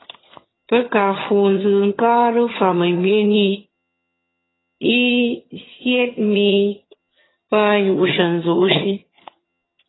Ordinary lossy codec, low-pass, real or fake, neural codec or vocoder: AAC, 16 kbps; 7.2 kHz; fake; vocoder, 22.05 kHz, 80 mel bands, HiFi-GAN